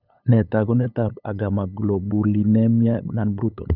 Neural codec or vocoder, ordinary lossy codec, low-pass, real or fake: codec, 16 kHz, 8 kbps, FunCodec, trained on LibriTTS, 25 frames a second; none; 5.4 kHz; fake